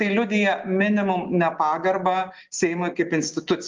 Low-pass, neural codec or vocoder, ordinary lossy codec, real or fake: 7.2 kHz; none; Opus, 32 kbps; real